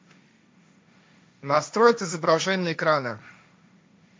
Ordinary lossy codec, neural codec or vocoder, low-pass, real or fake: none; codec, 16 kHz, 1.1 kbps, Voila-Tokenizer; none; fake